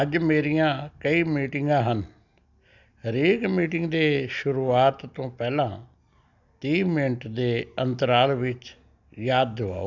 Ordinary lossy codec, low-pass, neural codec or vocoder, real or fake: none; 7.2 kHz; none; real